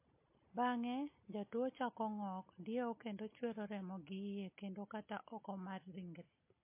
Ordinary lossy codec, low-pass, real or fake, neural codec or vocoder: MP3, 24 kbps; 3.6 kHz; real; none